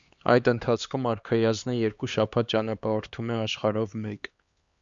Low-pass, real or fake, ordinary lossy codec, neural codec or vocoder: 7.2 kHz; fake; Opus, 64 kbps; codec, 16 kHz, 2 kbps, X-Codec, HuBERT features, trained on LibriSpeech